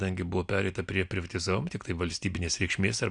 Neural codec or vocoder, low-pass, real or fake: none; 9.9 kHz; real